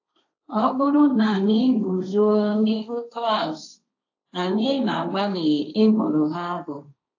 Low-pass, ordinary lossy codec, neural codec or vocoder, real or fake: 7.2 kHz; AAC, 48 kbps; codec, 16 kHz, 1.1 kbps, Voila-Tokenizer; fake